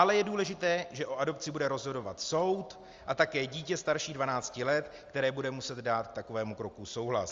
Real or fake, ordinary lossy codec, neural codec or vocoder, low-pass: real; Opus, 32 kbps; none; 7.2 kHz